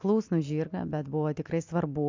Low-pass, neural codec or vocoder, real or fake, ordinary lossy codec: 7.2 kHz; none; real; MP3, 64 kbps